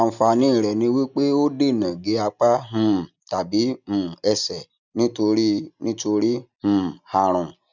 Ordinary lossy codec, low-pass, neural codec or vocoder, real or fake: none; 7.2 kHz; none; real